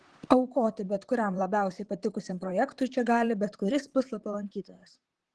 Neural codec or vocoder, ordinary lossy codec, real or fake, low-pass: vocoder, 44.1 kHz, 128 mel bands, Pupu-Vocoder; Opus, 16 kbps; fake; 10.8 kHz